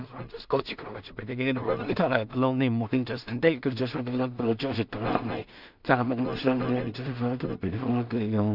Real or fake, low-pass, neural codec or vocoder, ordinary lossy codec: fake; 5.4 kHz; codec, 16 kHz in and 24 kHz out, 0.4 kbps, LongCat-Audio-Codec, two codebook decoder; none